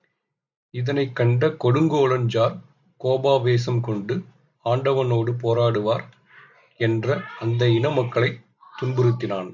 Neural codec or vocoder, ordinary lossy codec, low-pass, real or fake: none; MP3, 64 kbps; 7.2 kHz; real